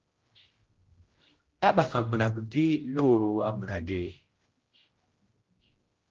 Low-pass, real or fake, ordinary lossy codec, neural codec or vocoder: 7.2 kHz; fake; Opus, 16 kbps; codec, 16 kHz, 0.5 kbps, X-Codec, HuBERT features, trained on general audio